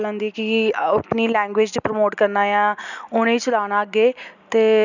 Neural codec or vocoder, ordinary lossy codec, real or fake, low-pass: none; none; real; 7.2 kHz